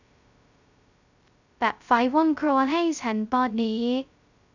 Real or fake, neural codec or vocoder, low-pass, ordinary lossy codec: fake; codec, 16 kHz, 0.2 kbps, FocalCodec; 7.2 kHz; none